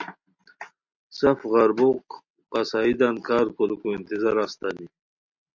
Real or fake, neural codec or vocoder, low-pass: real; none; 7.2 kHz